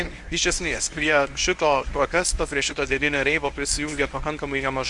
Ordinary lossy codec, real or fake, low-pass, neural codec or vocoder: Opus, 64 kbps; fake; 10.8 kHz; codec, 24 kHz, 0.9 kbps, WavTokenizer, medium speech release version 1